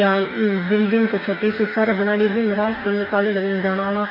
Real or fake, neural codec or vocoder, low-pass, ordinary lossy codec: fake; codec, 44.1 kHz, 2.6 kbps, DAC; 5.4 kHz; none